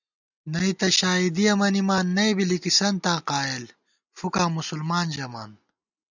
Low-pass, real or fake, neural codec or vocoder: 7.2 kHz; real; none